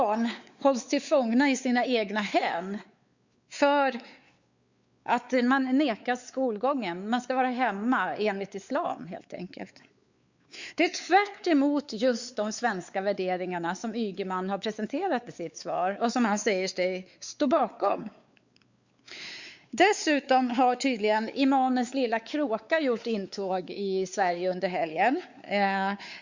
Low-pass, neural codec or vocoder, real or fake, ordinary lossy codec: 7.2 kHz; codec, 16 kHz, 4 kbps, X-Codec, WavLM features, trained on Multilingual LibriSpeech; fake; Opus, 64 kbps